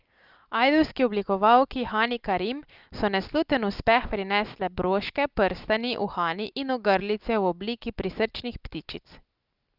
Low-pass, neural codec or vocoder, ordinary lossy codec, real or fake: 5.4 kHz; none; Opus, 24 kbps; real